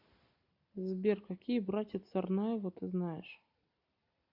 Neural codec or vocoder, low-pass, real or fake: none; 5.4 kHz; real